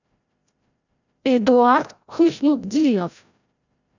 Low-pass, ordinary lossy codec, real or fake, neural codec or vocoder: 7.2 kHz; none; fake; codec, 16 kHz, 0.5 kbps, FreqCodec, larger model